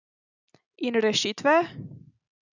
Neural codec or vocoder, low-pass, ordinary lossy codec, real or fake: none; 7.2 kHz; none; real